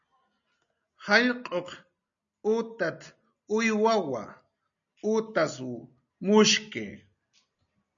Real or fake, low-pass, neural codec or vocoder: real; 7.2 kHz; none